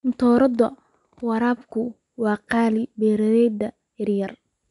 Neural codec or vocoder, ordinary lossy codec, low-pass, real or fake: none; none; 10.8 kHz; real